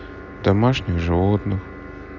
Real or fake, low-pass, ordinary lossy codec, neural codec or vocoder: real; 7.2 kHz; none; none